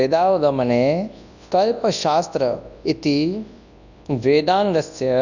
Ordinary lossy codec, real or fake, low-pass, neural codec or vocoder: none; fake; 7.2 kHz; codec, 24 kHz, 0.9 kbps, WavTokenizer, large speech release